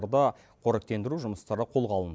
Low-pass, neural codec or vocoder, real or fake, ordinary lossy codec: none; none; real; none